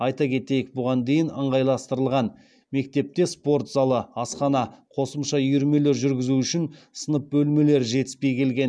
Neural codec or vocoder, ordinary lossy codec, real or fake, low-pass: none; none; real; none